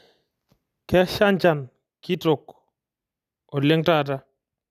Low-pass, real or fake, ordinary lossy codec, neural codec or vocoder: 14.4 kHz; real; none; none